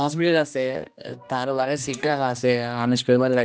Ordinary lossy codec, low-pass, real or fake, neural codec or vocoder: none; none; fake; codec, 16 kHz, 1 kbps, X-Codec, HuBERT features, trained on general audio